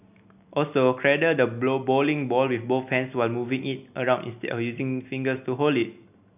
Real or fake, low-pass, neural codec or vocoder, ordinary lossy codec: real; 3.6 kHz; none; none